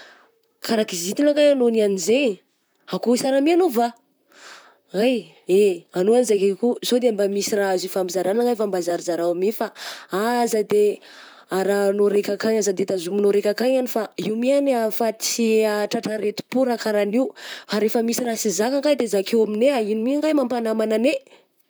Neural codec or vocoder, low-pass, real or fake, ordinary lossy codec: vocoder, 44.1 kHz, 128 mel bands, Pupu-Vocoder; none; fake; none